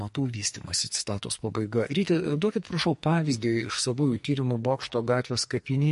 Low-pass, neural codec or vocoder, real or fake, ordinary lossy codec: 14.4 kHz; codec, 44.1 kHz, 2.6 kbps, SNAC; fake; MP3, 48 kbps